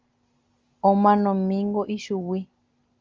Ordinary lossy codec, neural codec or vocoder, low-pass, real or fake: Opus, 32 kbps; none; 7.2 kHz; real